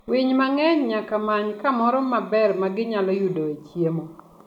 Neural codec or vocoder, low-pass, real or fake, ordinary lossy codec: none; 19.8 kHz; real; none